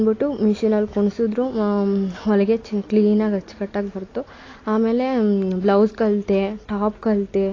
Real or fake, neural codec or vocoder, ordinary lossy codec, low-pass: real; none; AAC, 32 kbps; 7.2 kHz